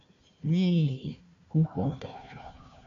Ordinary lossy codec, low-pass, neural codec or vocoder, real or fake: MP3, 64 kbps; 7.2 kHz; codec, 16 kHz, 1 kbps, FunCodec, trained on Chinese and English, 50 frames a second; fake